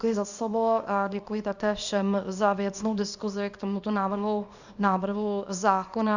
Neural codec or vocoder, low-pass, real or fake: codec, 24 kHz, 0.9 kbps, WavTokenizer, small release; 7.2 kHz; fake